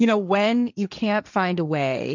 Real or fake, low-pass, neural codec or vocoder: fake; 7.2 kHz; codec, 16 kHz, 1.1 kbps, Voila-Tokenizer